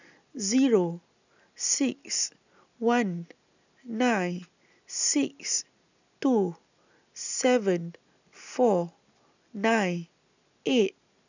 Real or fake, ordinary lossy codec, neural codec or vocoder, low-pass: real; none; none; 7.2 kHz